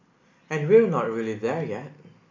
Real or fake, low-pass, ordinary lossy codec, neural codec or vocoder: real; 7.2 kHz; MP3, 48 kbps; none